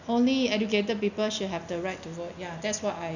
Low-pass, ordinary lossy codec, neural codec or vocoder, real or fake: 7.2 kHz; none; none; real